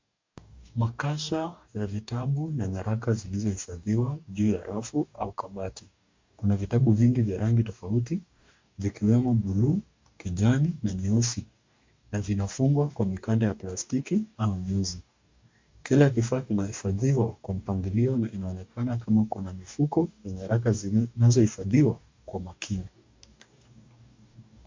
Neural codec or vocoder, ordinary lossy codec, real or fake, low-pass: codec, 44.1 kHz, 2.6 kbps, DAC; MP3, 64 kbps; fake; 7.2 kHz